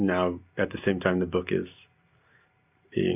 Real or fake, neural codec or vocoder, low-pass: real; none; 3.6 kHz